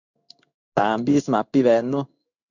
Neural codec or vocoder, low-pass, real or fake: codec, 16 kHz in and 24 kHz out, 1 kbps, XY-Tokenizer; 7.2 kHz; fake